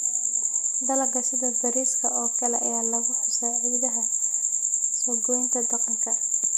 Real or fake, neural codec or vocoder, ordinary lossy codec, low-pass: real; none; none; none